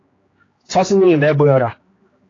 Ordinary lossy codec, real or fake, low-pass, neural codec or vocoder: AAC, 32 kbps; fake; 7.2 kHz; codec, 16 kHz, 2 kbps, X-Codec, HuBERT features, trained on general audio